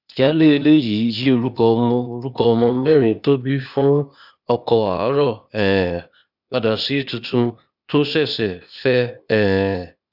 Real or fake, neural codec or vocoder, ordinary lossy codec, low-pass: fake; codec, 16 kHz, 0.8 kbps, ZipCodec; none; 5.4 kHz